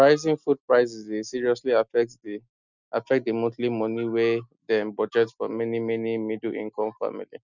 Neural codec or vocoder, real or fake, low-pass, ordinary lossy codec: none; real; 7.2 kHz; none